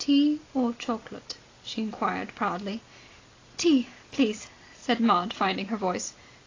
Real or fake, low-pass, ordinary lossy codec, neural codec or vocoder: real; 7.2 kHz; AAC, 32 kbps; none